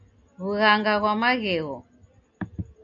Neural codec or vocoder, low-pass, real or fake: none; 7.2 kHz; real